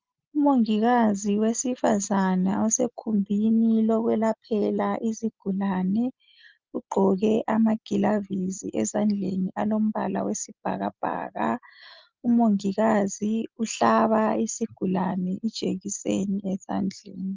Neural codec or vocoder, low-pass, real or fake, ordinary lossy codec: none; 7.2 kHz; real; Opus, 24 kbps